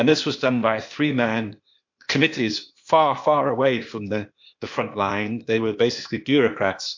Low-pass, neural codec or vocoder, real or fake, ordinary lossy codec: 7.2 kHz; codec, 16 kHz, 0.8 kbps, ZipCodec; fake; MP3, 48 kbps